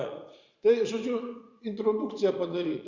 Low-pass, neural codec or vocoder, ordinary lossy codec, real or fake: 7.2 kHz; vocoder, 44.1 kHz, 80 mel bands, Vocos; Opus, 64 kbps; fake